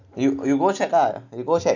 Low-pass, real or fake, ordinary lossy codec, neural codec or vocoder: 7.2 kHz; real; none; none